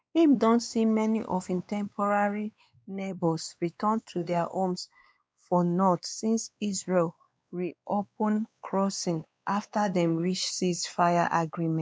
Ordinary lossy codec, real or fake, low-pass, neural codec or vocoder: none; fake; none; codec, 16 kHz, 2 kbps, X-Codec, WavLM features, trained on Multilingual LibriSpeech